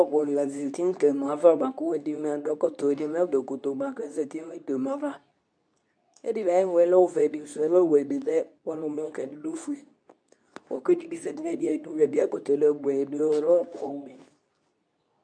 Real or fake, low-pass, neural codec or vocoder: fake; 9.9 kHz; codec, 24 kHz, 0.9 kbps, WavTokenizer, medium speech release version 2